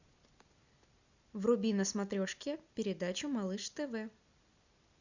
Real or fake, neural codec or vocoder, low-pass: real; none; 7.2 kHz